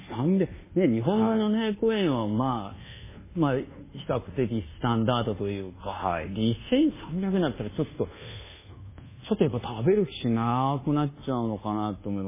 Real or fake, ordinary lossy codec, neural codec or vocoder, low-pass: fake; MP3, 16 kbps; codec, 24 kHz, 1.2 kbps, DualCodec; 3.6 kHz